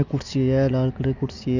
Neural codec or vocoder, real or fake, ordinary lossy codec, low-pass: none; real; none; 7.2 kHz